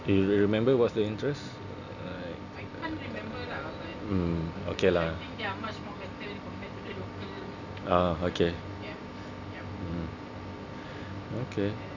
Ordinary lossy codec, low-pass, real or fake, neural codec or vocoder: none; 7.2 kHz; real; none